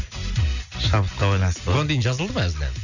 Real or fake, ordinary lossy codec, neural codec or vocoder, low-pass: real; none; none; 7.2 kHz